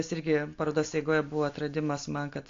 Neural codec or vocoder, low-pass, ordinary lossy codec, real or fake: none; 7.2 kHz; AAC, 48 kbps; real